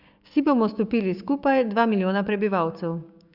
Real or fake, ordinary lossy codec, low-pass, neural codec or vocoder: fake; Opus, 64 kbps; 5.4 kHz; codec, 16 kHz, 6 kbps, DAC